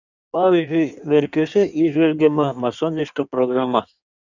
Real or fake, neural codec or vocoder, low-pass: fake; codec, 16 kHz in and 24 kHz out, 1.1 kbps, FireRedTTS-2 codec; 7.2 kHz